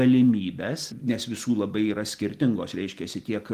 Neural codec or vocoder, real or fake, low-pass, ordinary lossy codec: none; real; 14.4 kHz; Opus, 24 kbps